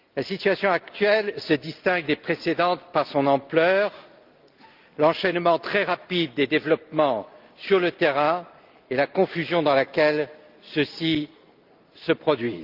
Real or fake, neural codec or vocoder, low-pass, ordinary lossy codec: real; none; 5.4 kHz; Opus, 32 kbps